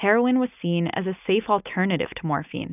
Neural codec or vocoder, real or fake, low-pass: none; real; 3.6 kHz